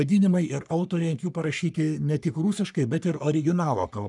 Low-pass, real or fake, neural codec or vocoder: 10.8 kHz; fake; codec, 44.1 kHz, 3.4 kbps, Pupu-Codec